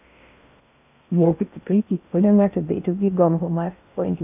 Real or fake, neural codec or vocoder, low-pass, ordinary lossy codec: fake; codec, 16 kHz in and 24 kHz out, 0.6 kbps, FocalCodec, streaming, 4096 codes; 3.6 kHz; none